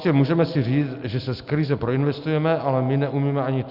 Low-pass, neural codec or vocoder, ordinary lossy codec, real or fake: 5.4 kHz; none; Opus, 64 kbps; real